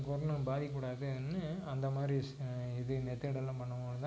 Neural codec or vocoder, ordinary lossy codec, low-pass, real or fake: none; none; none; real